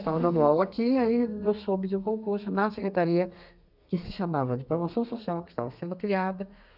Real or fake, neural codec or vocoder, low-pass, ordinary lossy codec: fake; codec, 32 kHz, 1.9 kbps, SNAC; 5.4 kHz; none